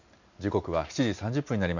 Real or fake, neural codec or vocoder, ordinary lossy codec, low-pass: real; none; none; 7.2 kHz